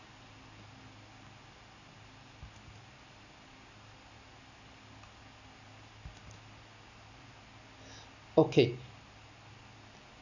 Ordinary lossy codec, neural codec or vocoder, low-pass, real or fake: none; none; 7.2 kHz; real